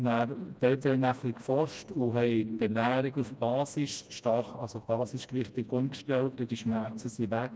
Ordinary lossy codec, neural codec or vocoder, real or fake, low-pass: none; codec, 16 kHz, 1 kbps, FreqCodec, smaller model; fake; none